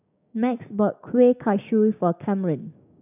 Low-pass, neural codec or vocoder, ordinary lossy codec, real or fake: 3.6 kHz; codec, 16 kHz, 6 kbps, DAC; none; fake